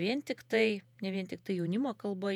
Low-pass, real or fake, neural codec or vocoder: 19.8 kHz; fake; vocoder, 48 kHz, 128 mel bands, Vocos